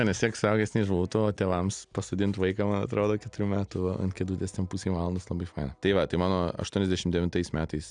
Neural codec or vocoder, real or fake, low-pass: none; real; 9.9 kHz